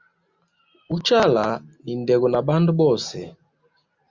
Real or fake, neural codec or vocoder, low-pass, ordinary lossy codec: real; none; 7.2 kHz; Opus, 64 kbps